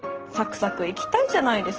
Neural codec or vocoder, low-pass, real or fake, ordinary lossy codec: none; 7.2 kHz; real; Opus, 16 kbps